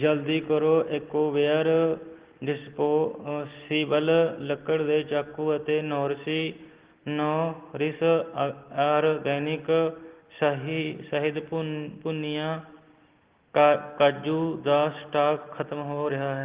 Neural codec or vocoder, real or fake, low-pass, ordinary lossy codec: none; real; 3.6 kHz; Opus, 16 kbps